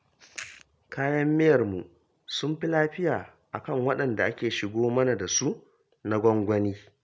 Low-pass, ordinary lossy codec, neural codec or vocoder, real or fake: none; none; none; real